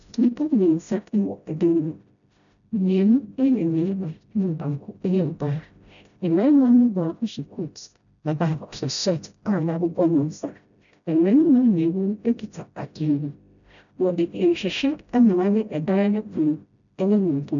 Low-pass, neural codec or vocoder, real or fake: 7.2 kHz; codec, 16 kHz, 0.5 kbps, FreqCodec, smaller model; fake